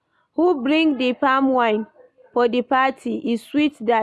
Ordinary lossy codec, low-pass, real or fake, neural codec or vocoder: none; none; real; none